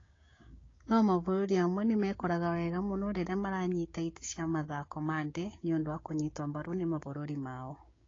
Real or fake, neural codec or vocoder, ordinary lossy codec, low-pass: fake; codec, 16 kHz, 6 kbps, DAC; AAC, 32 kbps; 7.2 kHz